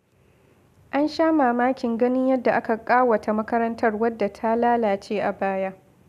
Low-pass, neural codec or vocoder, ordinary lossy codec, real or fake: 14.4 kHz; none; none; real